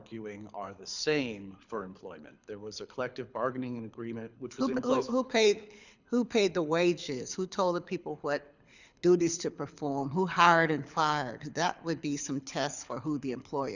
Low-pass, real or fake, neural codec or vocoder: 7.2 kHz; fake; codec, 24 kHz, 6 kbps, HILCodec